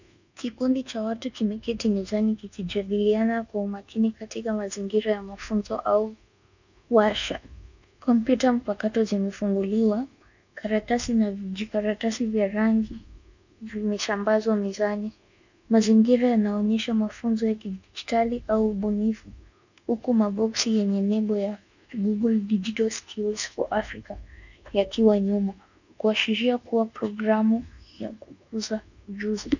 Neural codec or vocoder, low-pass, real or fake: codec, 24 kHz, 1.2 kbps, DualCodec; 7.2 kHz; fake